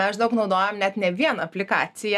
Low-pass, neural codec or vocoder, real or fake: 14.4 kHz; none; real